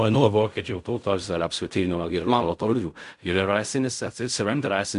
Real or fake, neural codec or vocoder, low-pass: fake; codec, 16 kHz in and 24 kHz out, 0.4 kbps, LongCat-Audio-Codec, fine tuned four codebook decoder; 10.8 kHz